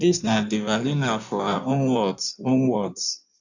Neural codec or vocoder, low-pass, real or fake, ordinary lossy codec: codec, 16 kHz in and 24 kHz out, 1.1 kbps, FireRedTTS-2 codec; 7.2 kHz; fake; none